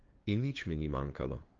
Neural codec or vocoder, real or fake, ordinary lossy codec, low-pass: codec, 16 kHz, 2 kbps, FunCodec, trained on LibriTTS, 25 frames a second; fake; Opus, 16 kbps; 7.2 kHz